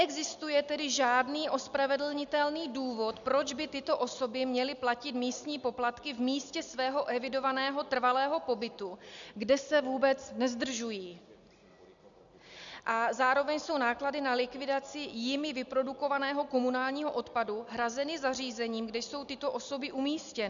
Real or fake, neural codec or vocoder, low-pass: real; none; 7.2 kHz